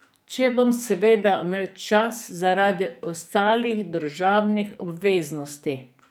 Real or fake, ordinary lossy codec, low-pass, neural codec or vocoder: fake; none; none; codec, 44.1 kHz, 2.6 kbps, SNAC